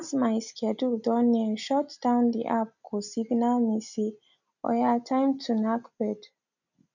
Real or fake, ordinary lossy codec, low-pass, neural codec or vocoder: real; none; 7.2 kHz; none